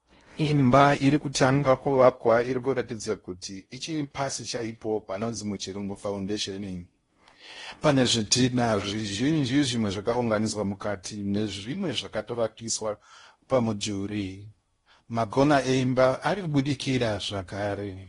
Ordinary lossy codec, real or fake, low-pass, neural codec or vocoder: AAC, 32 kbps; fake; 10.8 kHz; codec, 16 kHz in and 24 kHz out, 0.6 kbps, FocalCodec, streaming, 4096 codes